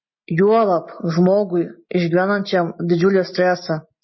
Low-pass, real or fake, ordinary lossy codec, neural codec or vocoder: 7.2 kHz; real; MP3, 24 kbps; none